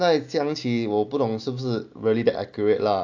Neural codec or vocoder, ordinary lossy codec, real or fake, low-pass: none; AAC, 48 kbps; real; 7.2 kHz